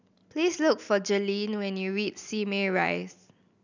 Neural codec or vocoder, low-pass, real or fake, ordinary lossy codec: none; 7.2 kHz; real; none